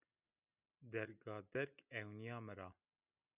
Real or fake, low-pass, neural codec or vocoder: real; 3.6 kHz; none